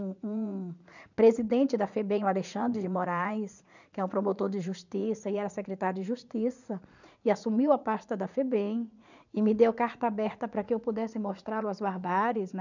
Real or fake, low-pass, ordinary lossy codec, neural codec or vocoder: fake; 7.2 kHz; none; vocoder, 44.1 kHz, 80 mel bands, Vocos